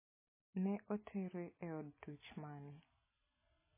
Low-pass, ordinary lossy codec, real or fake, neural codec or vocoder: 3.6 kHz; MP3, 16 kbps; real; none